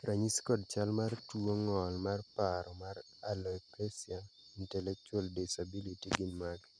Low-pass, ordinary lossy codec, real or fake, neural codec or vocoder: 9.9 kHz; none; real; none